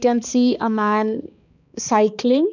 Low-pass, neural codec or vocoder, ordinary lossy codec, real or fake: 7.2 kHz; codec, 16 kHz, 2 kbps, X-Codec, HuBERT features, trained on balanced general audio; none; fake